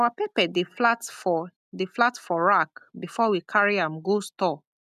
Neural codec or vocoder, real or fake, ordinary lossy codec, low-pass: none; real; none; 14.4 kHz